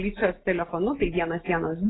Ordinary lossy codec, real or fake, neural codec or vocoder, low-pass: AAC, 16 kbps; real; none; 7.2 kHz